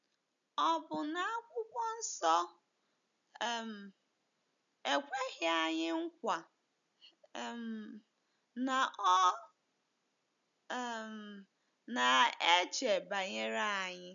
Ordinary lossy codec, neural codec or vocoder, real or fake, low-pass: none; none; real; 7.2 kHz